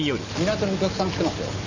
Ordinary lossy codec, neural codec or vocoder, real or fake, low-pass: none; none; real; 7.2 kHz